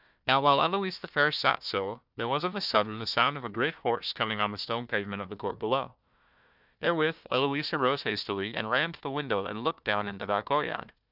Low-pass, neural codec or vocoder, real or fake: 5.4 kHz; codec, 16 kHz, 1 kbps, FunCodec, trained on Chinese and English, 50 frames a second; fake